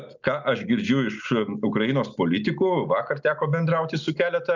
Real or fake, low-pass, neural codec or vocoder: real; 7.2 kHz; none